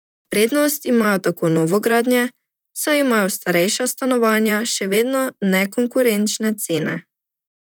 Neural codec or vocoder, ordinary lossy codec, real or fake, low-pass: vocoder, 44.1 kHz, 128 mel bands, Pupu-Vocoder; none; fake; none